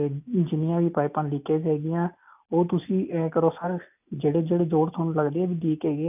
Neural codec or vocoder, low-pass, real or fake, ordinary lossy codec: none; 3.6 kHz; real; none